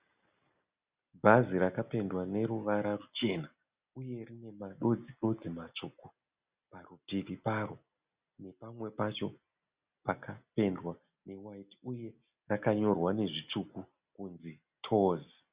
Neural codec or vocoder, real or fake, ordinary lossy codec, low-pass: none; real; Opus, 64 kbps; 3.6 kHz